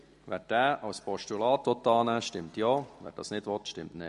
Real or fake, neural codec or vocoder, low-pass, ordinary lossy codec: real; none; 14.4 kHz; MP3, 48 kbps